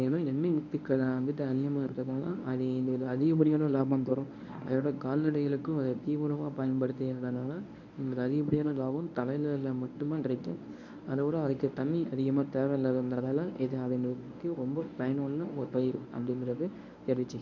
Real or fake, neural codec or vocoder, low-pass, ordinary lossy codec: fake; codec, 24 kHz, 0.9 kbps, WavTokenizer, medium speech release version 1; 7.2 kHz; none